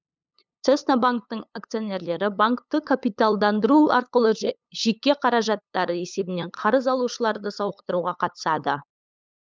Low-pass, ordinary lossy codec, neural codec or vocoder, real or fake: none; none; codec, 16 kHz, 8 kbps, FunCodec, trained on LibriTTS, 25 frames a second; fake